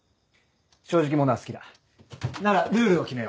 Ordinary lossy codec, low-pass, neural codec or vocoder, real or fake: none; none; none; real